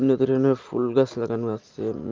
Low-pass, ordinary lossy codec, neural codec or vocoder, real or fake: 7.2 kHz; Opus, 32 kbps; none; real